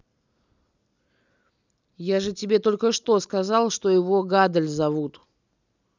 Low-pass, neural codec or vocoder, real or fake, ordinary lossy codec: 7.2 kHz; none; real; none